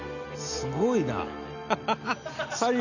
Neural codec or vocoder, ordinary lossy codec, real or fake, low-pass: none; none; real; 7.2 kHz